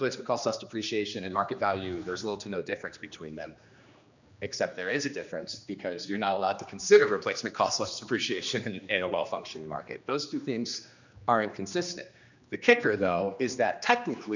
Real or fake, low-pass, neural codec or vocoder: fake; 7.2 kHz; codec, 16 kHz, 2 kbps, X-Codec, HuBERT features, trained on general audio